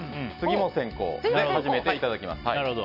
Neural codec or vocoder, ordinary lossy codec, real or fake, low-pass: none; none; real; 5.4 kHz